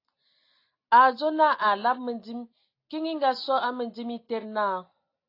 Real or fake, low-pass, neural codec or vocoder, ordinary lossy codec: real; 5.4 kHz; none; AAC, 32 kbps